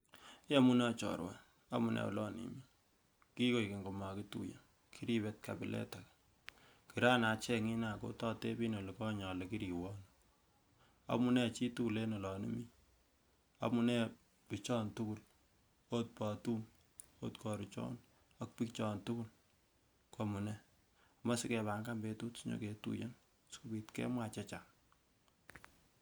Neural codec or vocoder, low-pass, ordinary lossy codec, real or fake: none; none; none; real